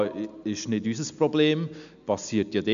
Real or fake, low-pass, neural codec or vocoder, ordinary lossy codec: real; 7.2 kHz; none; none